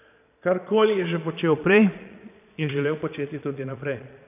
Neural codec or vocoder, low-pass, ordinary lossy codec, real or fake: vocoder, 22.05 kHz, 80 mel bands, Vocos; 3.6 kHz; none; fake